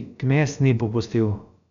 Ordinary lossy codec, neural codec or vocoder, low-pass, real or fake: none; codec, 16 kHz, about 1 kbps, DyCAST, with the encoder's durations; 7.2 kHz; fake